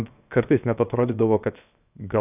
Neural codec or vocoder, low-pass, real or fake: codec, 16 kHz, 0.7 kbps, FocalCodec; 3.6 kHz; fake